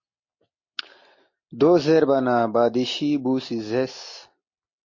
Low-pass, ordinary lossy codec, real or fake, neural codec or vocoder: 7.2 kHz; MP3, 32 kbps; real; none